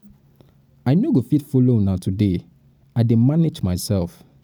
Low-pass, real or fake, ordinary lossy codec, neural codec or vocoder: none; real; none; none